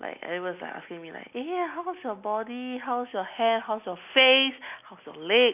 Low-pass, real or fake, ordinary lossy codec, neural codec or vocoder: 3.6 kHz; real; none; none